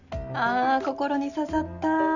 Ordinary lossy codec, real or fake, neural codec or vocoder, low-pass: none; real; none; 7.2 kHz